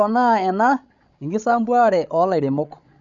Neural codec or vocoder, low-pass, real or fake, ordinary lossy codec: codec, 16 kHz, 16 kbps, FreqCodec, larger model; 7.2 kHz; fake; none